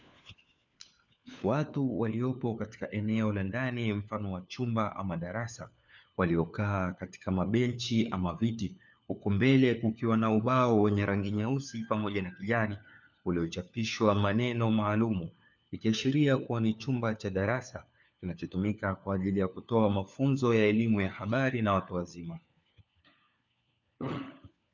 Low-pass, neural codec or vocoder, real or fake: 7.2 kHz; codec, 16 kHz, 4 kbps, FunCodec, trained on LibriTTS, 50 frames a second; fake